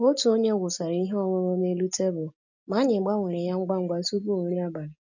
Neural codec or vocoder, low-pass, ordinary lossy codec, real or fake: none; 7.2 kHz; none; real